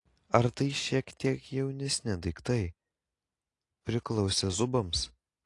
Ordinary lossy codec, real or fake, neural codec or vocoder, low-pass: AAC, 48 kbps; real; none; 10.8 kHz